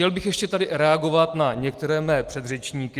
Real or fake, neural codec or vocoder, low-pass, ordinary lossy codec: real; none; 14.4 kHz; Opus, 24 kbps